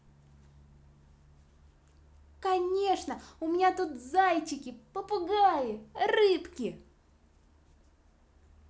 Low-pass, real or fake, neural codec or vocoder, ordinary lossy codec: none; real; none; none